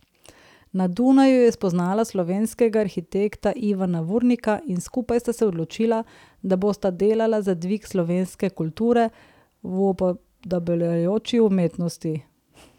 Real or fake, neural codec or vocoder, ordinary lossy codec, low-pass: real; none; none; 19.8 kHz